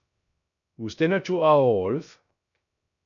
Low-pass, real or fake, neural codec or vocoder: 7.2 kHz; fake; codec, 16 kHz, 0.3 kbps, FocalCodec